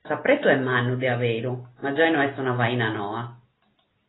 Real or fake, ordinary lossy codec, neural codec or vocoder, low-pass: real; AAC, 16 kbps; none; 7.2 kHz